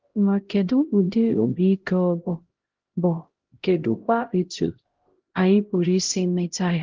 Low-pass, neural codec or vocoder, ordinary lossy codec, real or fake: 7.2 kHz; codec, 16 kHz, 0.5 kbps, X-Codec, HuBERT features, trained on LibriSpeech; Opus, 16 kbps; fake